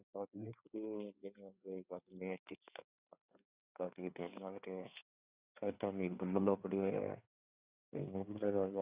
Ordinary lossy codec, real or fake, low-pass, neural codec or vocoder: Opus, 64 kbps; fake; 3.6 kHz; codec, 16 kHz, 4 kbps, FreqCodec, larger model